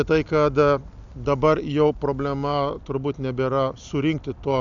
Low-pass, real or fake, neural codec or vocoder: 7.2 kHz; real; none